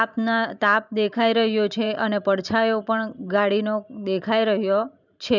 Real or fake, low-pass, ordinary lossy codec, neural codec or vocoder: fake; 7.2 kHz; none; vocoder, 44.1 kHz, 128 mel bands every 512 samples, BigVGAN v2